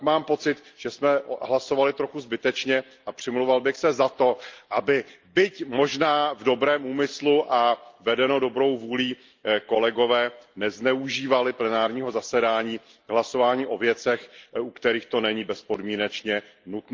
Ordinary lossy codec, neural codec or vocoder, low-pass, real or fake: Opus, 24 kbps; none; 7.2 kHz; real